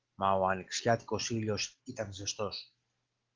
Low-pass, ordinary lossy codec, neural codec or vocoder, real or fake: 7.2 kHz; Opus, 16 kbps; none; real